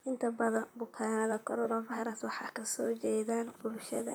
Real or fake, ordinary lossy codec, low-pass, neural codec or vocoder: fake; none; none; vocoder, 44.1 kHz, 128 mel bands, Pupu-Vocoder